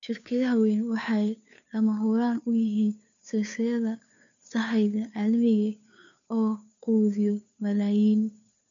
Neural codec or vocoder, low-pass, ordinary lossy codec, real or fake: codec, 16 kHz, 2 kbps, FunCodec, trained on Chinese and English, 25 frames a second; 7.2 kHz; none; fake